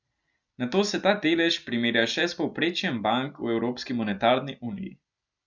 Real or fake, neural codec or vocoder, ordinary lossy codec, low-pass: real; none; none; 7.2 kHz